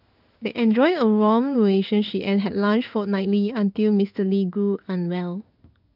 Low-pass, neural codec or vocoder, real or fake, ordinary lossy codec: 5.4 kHz; codec, 16 kHz in and 24 kHz out, 1 kbps, XY-Tokenizer; fake; none